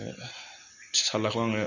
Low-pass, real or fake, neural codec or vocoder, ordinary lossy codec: 7.2 kHz; real; none; none